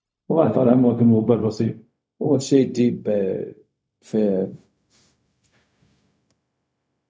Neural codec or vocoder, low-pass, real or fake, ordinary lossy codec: codec, 16 kHz, 0.4 kbps, LongCat-Audio-Codec; none; fake; none